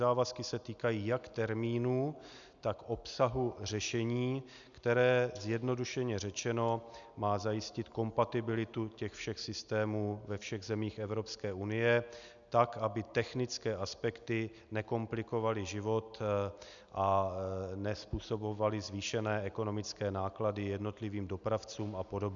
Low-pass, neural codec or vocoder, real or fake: 7.2 kHz; none; real